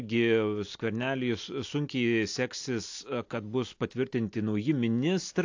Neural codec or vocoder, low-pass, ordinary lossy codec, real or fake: none; 7.2 kHz; AAC, 48 kbps; real